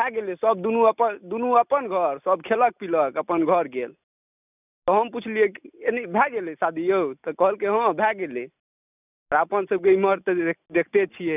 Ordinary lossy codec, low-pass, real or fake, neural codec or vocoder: none; 3.6 kHz; real; none